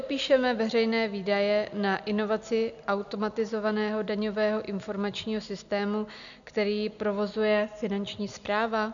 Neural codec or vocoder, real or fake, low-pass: none; real; 7.2 kHz